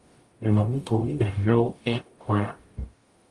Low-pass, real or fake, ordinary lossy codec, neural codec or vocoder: 10.8 kHz; fake; Opus, 32 kbps; codec, 44.1 kHz, 0.9 kbps, DAC